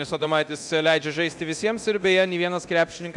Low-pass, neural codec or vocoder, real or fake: 10.8 kHz; codec, 24 kHz, 0.9 kbps, DualCodec; fake